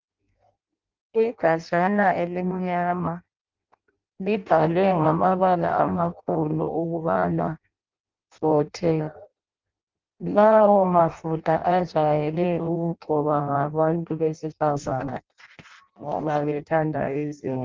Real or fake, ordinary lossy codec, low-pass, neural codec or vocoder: fake; Opus, 32 kbps; 7.2 kHz; codec, 16 kHz in and 24 kHz out, 0.6 kbps, FireRedTTS-2 codec